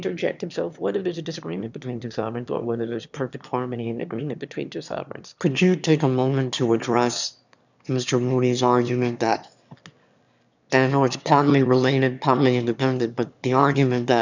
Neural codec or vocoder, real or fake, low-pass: autoencoder, 22.05 kHz, a latent of 192 numbers a frame, VITS, trained on one speaker; fake; 7.2 kHz